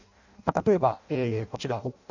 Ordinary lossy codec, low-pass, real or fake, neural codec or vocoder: none; 7.2 kHz; fake; codec, 16 kHz in and 24 kHz out, 0.6 kbps, FireRedTTS-2 codec